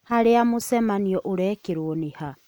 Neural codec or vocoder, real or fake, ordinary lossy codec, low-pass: none; real; none; none